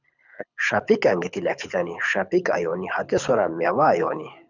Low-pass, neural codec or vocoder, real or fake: 7.2 kHz; codec, 24 kHz, 6 kbps, HILCodec; fake